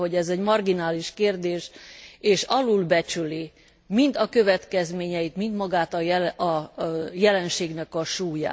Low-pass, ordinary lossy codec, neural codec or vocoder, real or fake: none; none; none; real